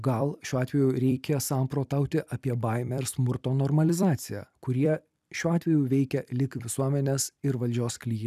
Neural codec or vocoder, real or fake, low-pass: vocoder, 44.1 kHz, 128 mel bands every 256 samples, BigVGAN v2; fake; 14.4 kHz